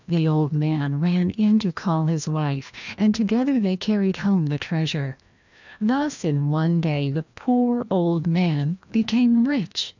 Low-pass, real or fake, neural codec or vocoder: 7.2 kHz; fake; codec, 16 kHz, 1 kbps, FreqCodec, larger model